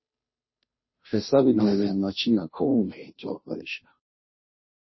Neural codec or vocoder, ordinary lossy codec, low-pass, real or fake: codec, 16 kHz, 0.5 kbps, FunCodec, trained on Chinese and English, 25 frames a second; MP3, 24 kbps; 7.2 kHz; fake